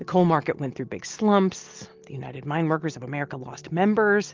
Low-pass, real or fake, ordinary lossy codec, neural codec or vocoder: 7.2 kHz; real; Opus, 32 kbps; none